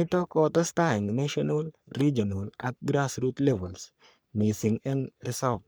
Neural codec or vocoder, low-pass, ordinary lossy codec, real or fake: codec, 44.1 kHz, 3.4 kbps, Pupu-Codec; none; none; fake